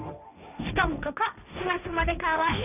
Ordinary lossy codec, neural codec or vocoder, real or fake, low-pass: none; codec, 16 kHz, 1.1 kbps, Voila-Tokenizer; fake; 3.6 kHz